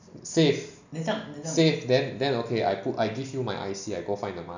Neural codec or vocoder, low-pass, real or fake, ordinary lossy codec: none; 7.2 kHz; real; none